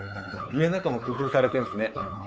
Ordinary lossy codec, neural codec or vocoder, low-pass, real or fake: none; codec, 16 kHz, 4 kbps, X-Codec, WavLM features, trained on Multilingual LibriSpeech; none; fake